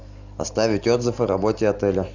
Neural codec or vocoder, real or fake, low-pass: none; real; 7.2 kHz